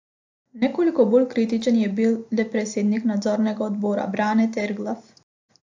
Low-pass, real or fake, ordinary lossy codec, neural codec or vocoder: 7.2 kHz; real; AAC, 48 kbps; none